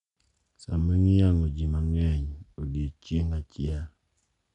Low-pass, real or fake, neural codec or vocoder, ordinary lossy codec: 10.8 kHz; real; none; none